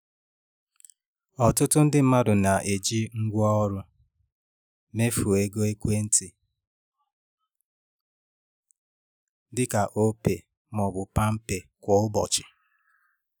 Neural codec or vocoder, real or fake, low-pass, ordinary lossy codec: none; real; none; none